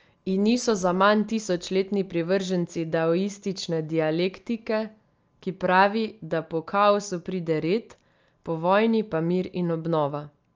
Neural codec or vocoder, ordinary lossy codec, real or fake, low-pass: none; Opus, 24 kbps; real; 7.2 kHz